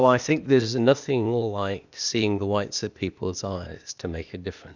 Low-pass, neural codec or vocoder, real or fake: 7.2 kHz; codec, 16 kHz, 0.8 kbps, ZipCodec; fake